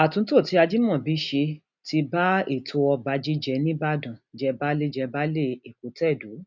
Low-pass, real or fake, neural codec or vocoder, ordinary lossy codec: 7.2 kHz; real; none; none